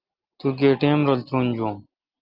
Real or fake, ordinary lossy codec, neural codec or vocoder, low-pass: real; Opus, 32 kbps; none; 5.4 kHz